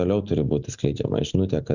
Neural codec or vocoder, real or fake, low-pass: none; real; 7.2 kHz